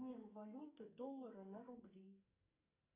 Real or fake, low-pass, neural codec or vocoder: fake; 3.6 kHz; codec, 44.1 kHz, 2.6 kbps, SNAC